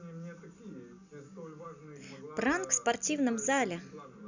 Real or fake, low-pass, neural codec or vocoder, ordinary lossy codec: real; 7.2 kHz; none; none